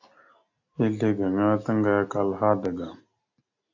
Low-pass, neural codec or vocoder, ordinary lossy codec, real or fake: 7.2 kHz; none; AAC, 48 kbps; real